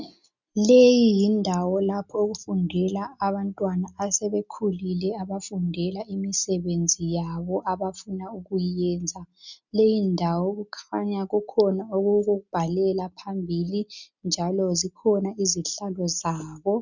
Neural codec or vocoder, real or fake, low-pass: none; real; 7.2 kHz